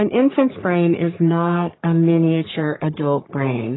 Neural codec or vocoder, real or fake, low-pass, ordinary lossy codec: codec, 44.1 kHz, 3.4 kbps, Pupu-Codec; fake; 7.2 kHz; AAC, 16 kbps